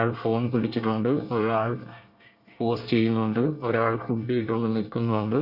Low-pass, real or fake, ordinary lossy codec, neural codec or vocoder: 5.4 kHz; fake; Opus, 64 kbps; codec, 24 kHz, 1 kbps, SNAC